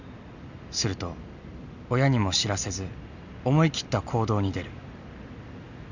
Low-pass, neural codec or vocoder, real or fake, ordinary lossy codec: 7.2 kHz; none; real; none